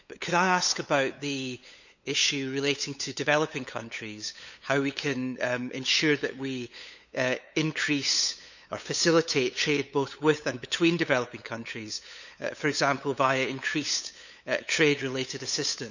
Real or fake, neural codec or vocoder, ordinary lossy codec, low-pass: fake; codec, 16 kHz, 8 kbps, FunCodec, trained on LibriTTS, 25 frames a second; none; 7.2 kHz